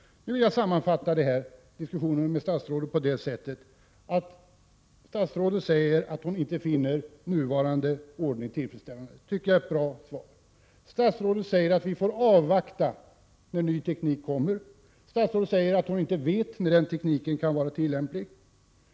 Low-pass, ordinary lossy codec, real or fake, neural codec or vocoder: none; none; real; none